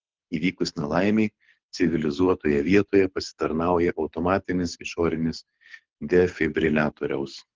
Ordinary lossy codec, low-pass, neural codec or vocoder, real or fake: Opus, 16 kbps; 7.2 kHz; codec, 24 kHz, 6 kbps, HILCodec; fake